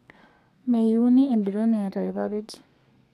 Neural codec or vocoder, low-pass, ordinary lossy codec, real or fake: codec, 32 kHz, 1.9 kbps, SNAC; 14.4 kHz; none; fake